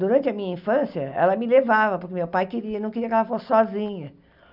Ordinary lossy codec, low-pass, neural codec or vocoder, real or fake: none; 5.4 kHz; none; real